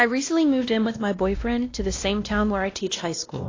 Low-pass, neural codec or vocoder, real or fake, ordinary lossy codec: 7.2 kHz; codec, 16 kHz, 1 kbps, X-Codec, WavLM features, trained on Multilingual LibriSpeech; fake; AAC, 32 kbps